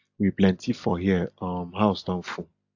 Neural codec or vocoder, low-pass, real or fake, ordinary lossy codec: none; 7.2 kHz; real; AAC, 48 kbps